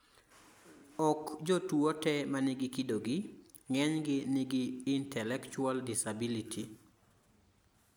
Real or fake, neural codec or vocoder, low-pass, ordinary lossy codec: real; none; none; none